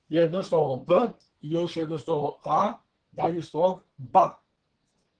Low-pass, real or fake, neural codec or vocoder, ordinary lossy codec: 9.9 kHz; fake; codec, 24 kHz, 1 kbps, SNAC; Opus, 16 kbps